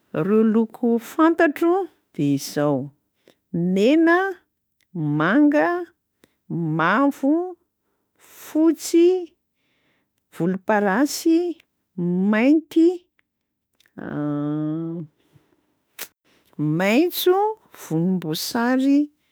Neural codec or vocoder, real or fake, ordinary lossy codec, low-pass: autoencoder, 48 kHz, 32 numbers a frame, DAC-VAE, trained on Japanese speech; fake; none; none